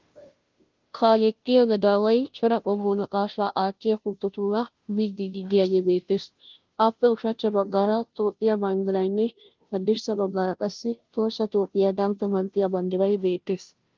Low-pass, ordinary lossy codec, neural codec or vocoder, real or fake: 7.2 kHz; Opus, 32 kbps; codec, 16 kHz, 0.5 kbps, FunCodec, trained on Chinese and English, 25 frames a second; fake